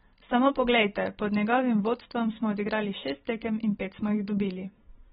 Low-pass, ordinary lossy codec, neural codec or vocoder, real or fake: 19.8 kHz; AAC, 16 kbps; none; real